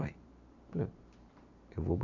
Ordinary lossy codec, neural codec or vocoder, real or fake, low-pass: none; none; real; 7.2 kHz